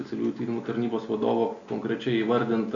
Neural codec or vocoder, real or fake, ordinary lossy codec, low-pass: none; real; Opus, 64 kbps; 7.2 kHz